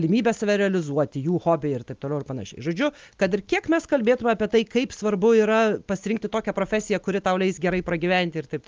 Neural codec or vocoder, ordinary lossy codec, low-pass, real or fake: none; Opus, 32 kbps; 7.2 kHz; real